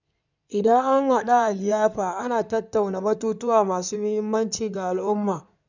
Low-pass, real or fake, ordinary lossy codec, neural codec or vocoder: 7.2 kHz; fake; none; codec, 16 kHz in and 24 kHz out, 2.2 kbps, FireRedTTS-2 codec